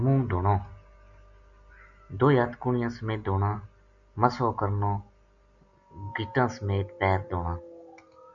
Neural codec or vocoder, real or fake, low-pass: none; real; 7.2 kHz